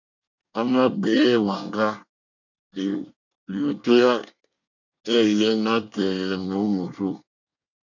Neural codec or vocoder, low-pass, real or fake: codec, 24 kHz, 1 kbps, SNAC; 7.2 kHz; fake